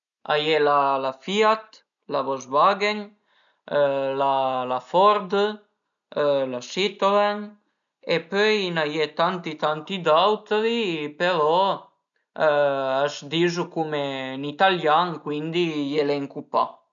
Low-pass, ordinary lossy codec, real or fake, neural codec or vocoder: 7.2 kHz; none; real; none